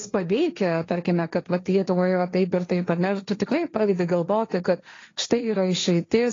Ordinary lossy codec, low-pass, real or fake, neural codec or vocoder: AAC, 32 kbps; 7.2 kHz; fake; codec, 16 kHz, 1.1 kbps, Voila-Tokenizer